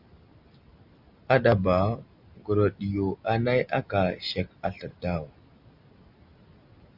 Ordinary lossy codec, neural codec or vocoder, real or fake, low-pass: Opus, 64 kbps; none; real; 5.4 kHz